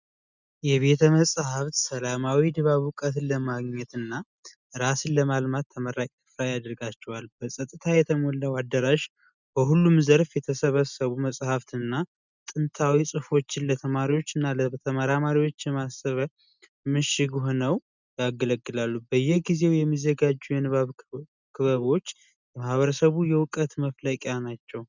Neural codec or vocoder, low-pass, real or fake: none; 7.2 kHz; real